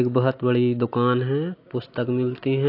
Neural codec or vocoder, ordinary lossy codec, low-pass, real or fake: none; AAC, 32 kbps; 5.4 kHz; real